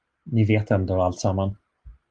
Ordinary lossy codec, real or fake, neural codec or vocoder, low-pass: Opus, 24 kbps; real; none; 9.9 kHz